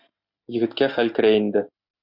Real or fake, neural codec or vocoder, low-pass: real; none; 5.4 kHz